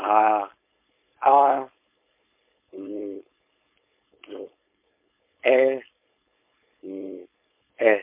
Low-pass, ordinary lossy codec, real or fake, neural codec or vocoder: 3.6 kHz; MP3, 32 kbps; fake; codec, 16 kHz, 4.8 kbps, FACodec